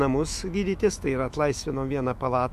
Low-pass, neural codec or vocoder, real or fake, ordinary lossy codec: 14.4 kHz; autoencoder, 48 kHz, 128 numbers a frame, DAC-VAE, trained on Japanese speech; fake; MP3, 64 kbps